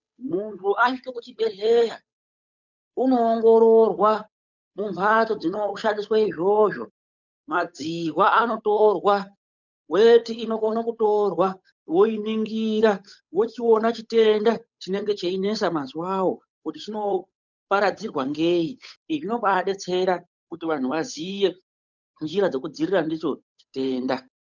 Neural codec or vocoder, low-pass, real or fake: codec, 16 kHz, 8 kbps, FunCodec, trained on Chinese and English, 25 frames a second; 7.2 kHz; fake